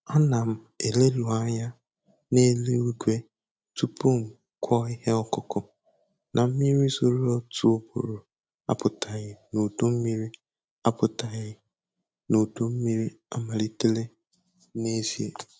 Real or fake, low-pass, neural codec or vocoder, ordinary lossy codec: real; none; none; none